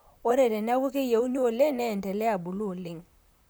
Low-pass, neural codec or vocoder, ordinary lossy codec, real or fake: none; vocoder, 44.1 kHz, 128 mel bands, Pupu-Vocoder; none; fake